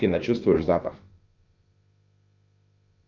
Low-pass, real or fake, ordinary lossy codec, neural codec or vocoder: 7.2 kHz; fake; Opus, 32 kbps; codec, 16 kHz, about 1 kbps, DyCAST, with the encoder's durations